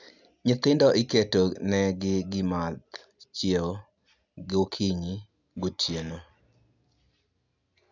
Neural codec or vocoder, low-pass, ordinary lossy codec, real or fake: none; 7.2 kHz; none; real